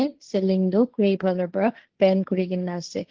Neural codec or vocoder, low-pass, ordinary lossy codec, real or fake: codec, 16 kHz, 1.1 kbps, Voila-Tokenizer; 7.2 kHz; Opus, 16 kbps; fake